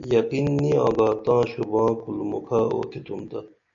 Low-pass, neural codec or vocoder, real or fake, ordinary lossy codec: 7.2 kHz; none; real; MP3, 96 kbps